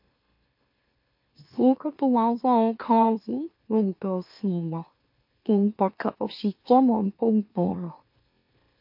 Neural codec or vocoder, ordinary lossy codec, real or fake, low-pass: autoencoder, 44.1 kHz, a latent of 192 numbers a frame, MeloTTS; MP3, 32 kbps; fake; 5.4 kHz